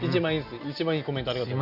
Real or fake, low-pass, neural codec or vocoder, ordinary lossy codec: real; 5.4 kHz; none; Opus, 64 kbps